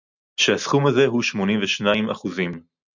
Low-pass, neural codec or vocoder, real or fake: 7.2 kHz; none; real